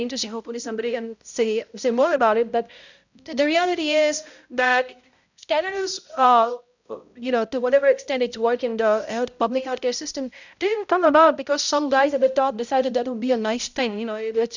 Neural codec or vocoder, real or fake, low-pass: codec, 16 kHz, 0.5 kbps, X-Codec, HuBERT features, trained on balanced general audio; fake; 7.2 kHz